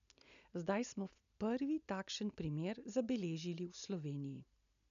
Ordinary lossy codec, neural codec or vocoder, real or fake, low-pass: none; none; real; 7.2 kHz